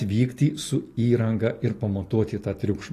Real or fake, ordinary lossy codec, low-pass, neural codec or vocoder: real; AAC, 48 kbps; 14.4 kHz; none